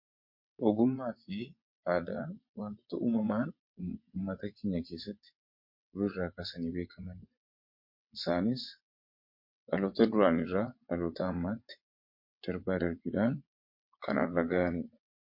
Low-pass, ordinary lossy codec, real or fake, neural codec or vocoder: 5.4 kHz; MP3, 32 kbps; fake; vocoder, 22.05 kHz, 80 mel bands, WaveNeXt